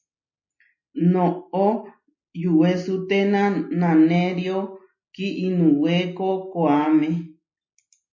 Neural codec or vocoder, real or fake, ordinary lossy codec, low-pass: none; real; MP3, 32 kbps; 7.2 kHz